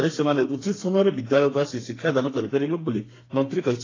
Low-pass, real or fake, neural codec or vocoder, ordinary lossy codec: 7.2 kHz; fake; codec, 32 kHz, 1.9 kbps, SNAC; AAC, 32 kbps